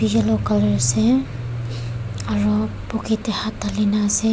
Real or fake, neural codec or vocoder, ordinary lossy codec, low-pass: real; none; none; none